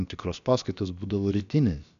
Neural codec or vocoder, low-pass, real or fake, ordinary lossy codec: codec, 16 kHz, about 1 kbps, DyCAST, with the encoder's durations; 7.2 kHz; fake; MP3, 96 kbps